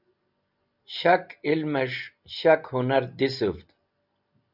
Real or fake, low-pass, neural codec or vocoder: real; 5.4 kHz; none